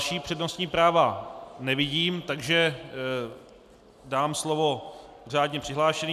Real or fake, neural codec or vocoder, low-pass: real; none; 14.4 kHz